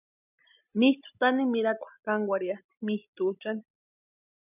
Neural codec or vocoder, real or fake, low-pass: none; real; 3.6 kHz